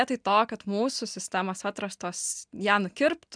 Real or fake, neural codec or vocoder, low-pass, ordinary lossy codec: real; none; 9.9 kHz; MP3, 96 kbps